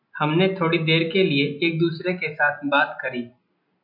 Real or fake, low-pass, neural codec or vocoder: real; 5.4 kHz; none